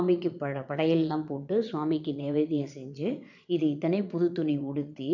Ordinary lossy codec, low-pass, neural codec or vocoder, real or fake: none; 7.2 kHz; vocoder, 22.05 kHz, 80 mel bands, Vocos; fake